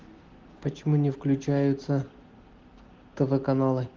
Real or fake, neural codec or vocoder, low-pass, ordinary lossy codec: real; none; 7.2 kHz; Opus, 16 kbps